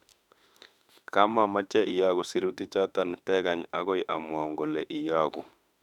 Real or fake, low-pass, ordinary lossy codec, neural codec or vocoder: fake; 19.8 kHz; none; autoencoder, 48 kHz, 32 numbers a frame, DAC-VAE, trained on Japanese speech